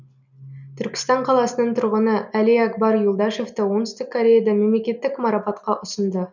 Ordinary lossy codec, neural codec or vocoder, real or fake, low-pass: none; none; real; 7.2 kHz